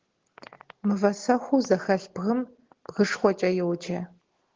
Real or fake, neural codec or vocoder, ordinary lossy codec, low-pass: real; none; Opus, 16 kbps; 7.2 kHz